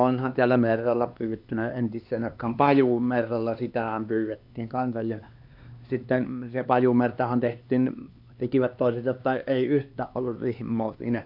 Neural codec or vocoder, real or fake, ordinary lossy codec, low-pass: codec, 16 kHz, 2 kbps, X-Codec, HuBERT features, trained on LibriSpeech; fake; AAC, 48 kbps; 5.4 kHz